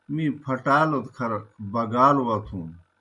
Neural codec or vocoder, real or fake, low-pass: none; real; 10.8 kHz